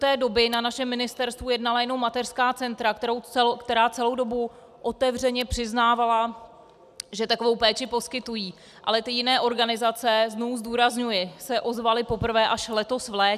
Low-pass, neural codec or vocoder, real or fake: 14.4 kHz; none; real